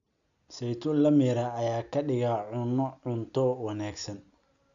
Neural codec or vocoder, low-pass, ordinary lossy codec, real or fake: none; 7.2 kHz; none; real